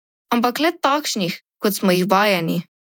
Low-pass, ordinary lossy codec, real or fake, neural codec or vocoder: 19.8 kHz; none; fake; vocoder, 48 kHz, 128 mel bands, Vocos